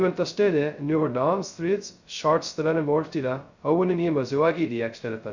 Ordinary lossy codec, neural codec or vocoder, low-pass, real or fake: Opus, 64 kbps; codec, 16 kHz, 0.2 kbps, FocalCodec; 7.2 kHz; fake